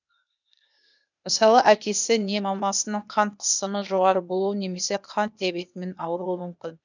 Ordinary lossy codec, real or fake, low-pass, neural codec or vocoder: none; fake; 7.2 kHz; codec, 16 kHz, 0.8 kbps, ZipCodec